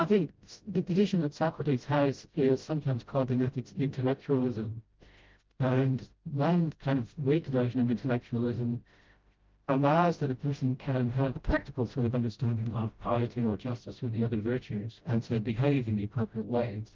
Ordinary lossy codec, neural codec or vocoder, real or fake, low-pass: Opus, 32 kbps; codec, 16 kHz, 0.5 kbps, FreqCodec, smaller model; fake; 7.2 kHz